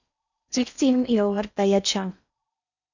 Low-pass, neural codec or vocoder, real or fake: 7.2 kHz; codec, 16 kHz in and 24 kHz out, 0.6 kbps, FocalCodec, streaming, 4096 codes; fake